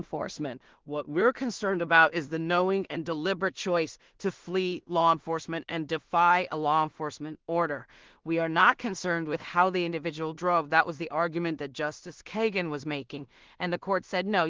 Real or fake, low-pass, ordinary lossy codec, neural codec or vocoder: fake; 7.2 kHz; Opus, 16 kbps; codec, 16 kHz in and 24 kHz out, 0.4 kbps, LongCat-Audio-Codec, two codebook decoder